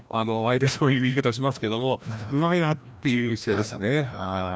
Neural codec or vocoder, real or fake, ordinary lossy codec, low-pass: codec, 16 kHz, 1 kbps, FreqCodec, larger model; fake; none; none